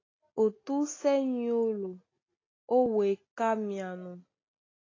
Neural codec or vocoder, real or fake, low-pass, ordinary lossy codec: none; real; 7.2 kHz; AAC, 32 kbps